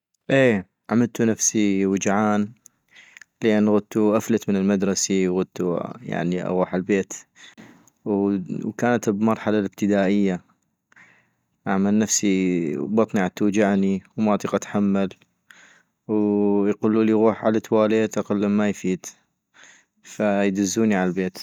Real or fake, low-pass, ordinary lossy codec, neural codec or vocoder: real; 19.8 kHz; none; none